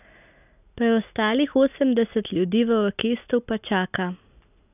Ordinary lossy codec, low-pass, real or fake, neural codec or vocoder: none; 3.6 kHz; real; none